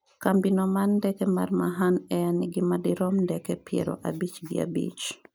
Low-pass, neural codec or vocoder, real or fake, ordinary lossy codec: none; none; real; none